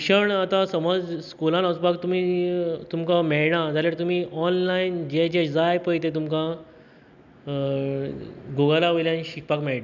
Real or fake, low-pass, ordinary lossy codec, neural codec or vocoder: real; 7.2 kHz; none; none